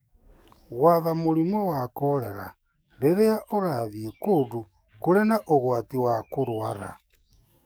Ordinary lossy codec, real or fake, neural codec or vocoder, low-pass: none; fake; codec, 44.1 kHz, 7.8 kbps, DAC; none